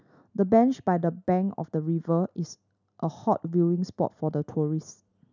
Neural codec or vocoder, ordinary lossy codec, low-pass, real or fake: none; none; 7.2 kHz; real